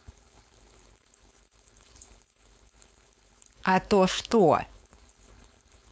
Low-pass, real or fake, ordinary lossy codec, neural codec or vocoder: none; fake; none; codec, 16 kHz, 4.8 kbps, FACodec